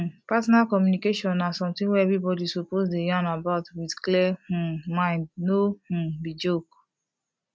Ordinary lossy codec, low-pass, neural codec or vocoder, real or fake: none; none; none; real